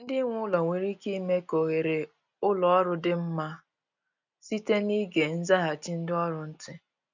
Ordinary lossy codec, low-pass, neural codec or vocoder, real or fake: none; 7.2 kHz; none; real